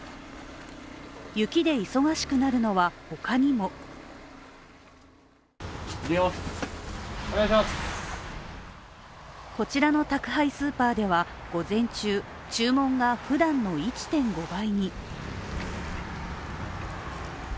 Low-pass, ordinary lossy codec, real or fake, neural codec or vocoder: none; none; real; none